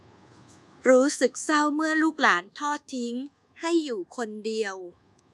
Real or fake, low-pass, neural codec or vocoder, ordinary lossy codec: fake; none; codec, 24 kHz, 1.2 kbps, DualCodec; none